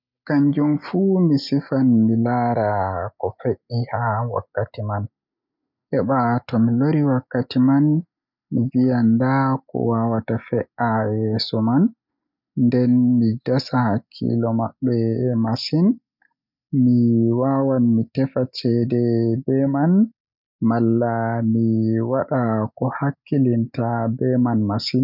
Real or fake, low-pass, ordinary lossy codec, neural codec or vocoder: real; 5.4 kHz; none; none